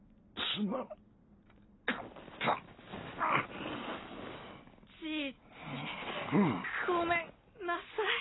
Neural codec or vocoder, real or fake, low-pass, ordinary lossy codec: none; real; 7.2 kHz; AAC, 16 kbps